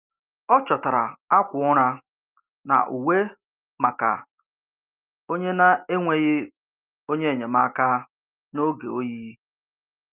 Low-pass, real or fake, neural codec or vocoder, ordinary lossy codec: 3.6 kHz; real; none; Opus, 24 kbps